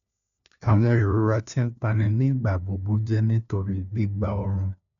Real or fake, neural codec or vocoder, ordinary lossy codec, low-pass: fake; codec, 16 kHz, 1 kbps, FunCodec, trained on LibriTTS, 50 frames a second; none; 7.2 kHz